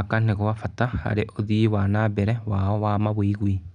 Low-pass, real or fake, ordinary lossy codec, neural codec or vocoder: 9.9 kHz; real; none; none